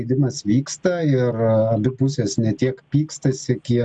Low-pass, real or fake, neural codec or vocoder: 9.9 kHz; real; none